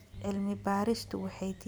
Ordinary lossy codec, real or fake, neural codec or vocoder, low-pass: none; fake; vocoder, 44.1 kHz, 128 mel bands every 256 samples, BigVGAN v2; none